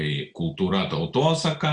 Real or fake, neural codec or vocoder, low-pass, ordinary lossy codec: real; none; 9.9 kHz; Opus, 64 kbps